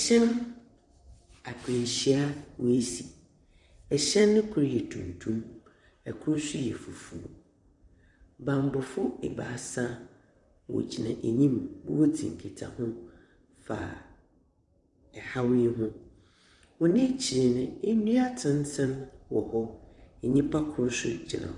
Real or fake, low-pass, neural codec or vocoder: fake; 10.8 kHz; vocoder, 44.1 kHz, 128 mel bands, Pupu-Vocoder